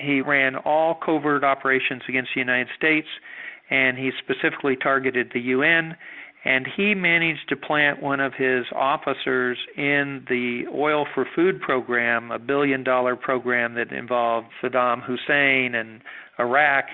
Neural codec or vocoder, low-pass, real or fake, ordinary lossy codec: none; 5.4 kHz; real; Opus, 64 kbps